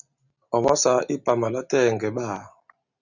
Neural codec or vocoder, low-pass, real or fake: none; 7.2 kHz; real